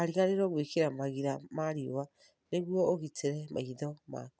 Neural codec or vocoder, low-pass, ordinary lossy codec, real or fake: none; none; none; real